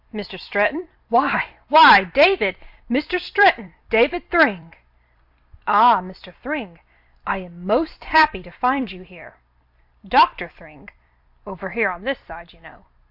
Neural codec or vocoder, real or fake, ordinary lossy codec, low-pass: vocoder, 44.1 kHz, 128 mel bands every 256 samples, BigVGAN v2; fake; AAC, 48 kbps; 5.4 kHz